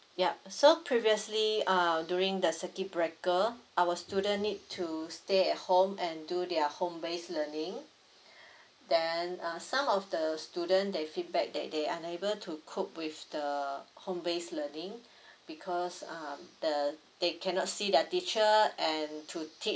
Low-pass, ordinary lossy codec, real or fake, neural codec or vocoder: none; none; real; none